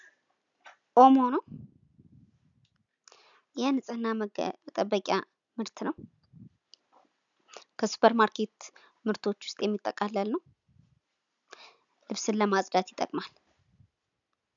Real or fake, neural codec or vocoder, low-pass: real; none; 7.2 kHz